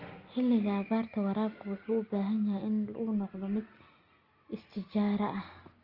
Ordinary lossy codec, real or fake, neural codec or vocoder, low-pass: Opus, 24 kbps; real; none; 5.4 kHz